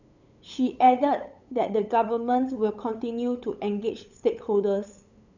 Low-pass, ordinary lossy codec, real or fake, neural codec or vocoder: 7.2 kHz; none; fake; codec, 16 kHz, 8 kbps, FunCodec, trained on LibriTTS, 25 frames a second